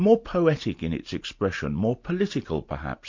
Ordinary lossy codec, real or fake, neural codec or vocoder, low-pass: MP3, 48 kbps; real; none; 7.2 kHz